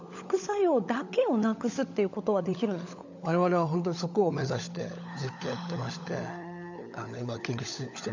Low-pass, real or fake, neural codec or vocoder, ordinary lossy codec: 7.2 kHz; fake; codec, 16 kHz, 16 kbps, FunCodec, trained on LibriTTS, 50 frames a second; none